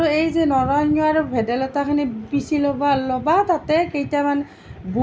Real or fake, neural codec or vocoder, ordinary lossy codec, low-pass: real; none; none; none